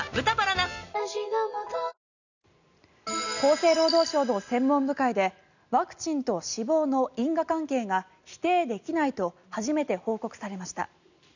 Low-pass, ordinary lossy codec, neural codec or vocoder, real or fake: 7.2 kHz; none; none; real